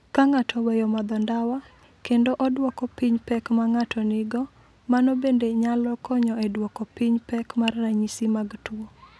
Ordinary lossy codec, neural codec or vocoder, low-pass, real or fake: none; none; none; real